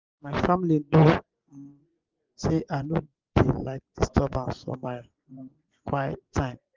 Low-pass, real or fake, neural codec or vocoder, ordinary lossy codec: 7.2 kHz; fake; vocoder, 24 kHz, 100 mel bands, Vocos; Opus, 24 kbps